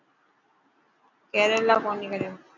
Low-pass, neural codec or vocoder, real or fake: 7.2 kHz; none; real